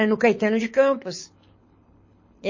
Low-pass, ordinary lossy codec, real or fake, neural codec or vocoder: 7.2 kHz; MP3, 32 kbps; fake; codec, 24 kHz, 6 kbps, HILCodec